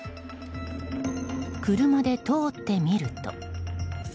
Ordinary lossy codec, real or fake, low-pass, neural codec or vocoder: none; real; none; none